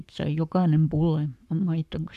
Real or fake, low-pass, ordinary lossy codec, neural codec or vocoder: fake; 14.4 kHz; AAC, 96 kbps; codec, 44.1 kHz, 7.8 kbps, Pupu-Codec